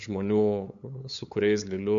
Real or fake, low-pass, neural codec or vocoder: fake; 7.2 kHz; codec, 16 kHz, 8 kbps, FunCodec, trained on LibriTTS, 25 frames a second